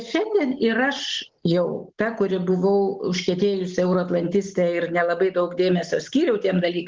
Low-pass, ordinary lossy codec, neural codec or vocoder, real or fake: 7.2 kHz; Opus, 16 kbps; none; real